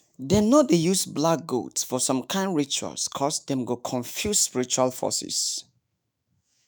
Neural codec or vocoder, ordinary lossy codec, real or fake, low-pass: autoencoder, 48 kHz, 128 numbers a frame, DAC-VAE, trained on Japanese speech; none; fake; none